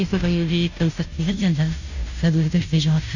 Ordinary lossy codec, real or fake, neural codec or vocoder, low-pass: none; fake; codec, 16 kHz, 0.5 kbps, FunCodec, trained on Chinese and English, 25 frames a second; 7.2 kHz